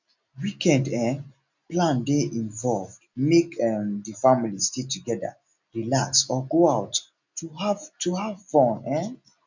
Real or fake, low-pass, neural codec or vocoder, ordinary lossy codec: real; 7.2 kHz; none; none